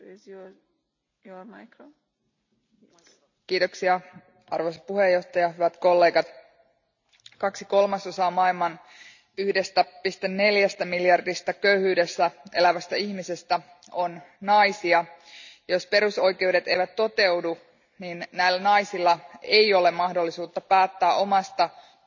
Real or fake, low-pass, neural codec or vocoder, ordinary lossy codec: real; 7.2 kHz; none; none